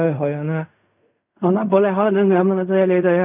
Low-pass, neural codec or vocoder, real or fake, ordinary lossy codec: 3.6 kHz; codec, 16 kHz in and 24 kHz out, 0.4 kbps, LongCat-Audio-Codec, fine tuned four codebook decoder; fake; none